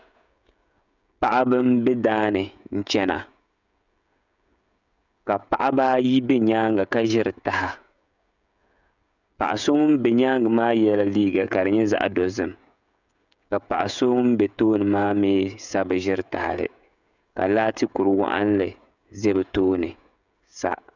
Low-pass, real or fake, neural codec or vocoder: 7.2 kHz; fake; codec, 16 kHz, 16 kbps, FreqCodec, smaller model